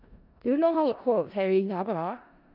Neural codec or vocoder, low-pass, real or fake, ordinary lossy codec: codec, 16 kHz in and 24 kHz out, 0.4 kbps, LongCat-Audio-Codec, four codebook decoder; 5.4 kHz; fake; none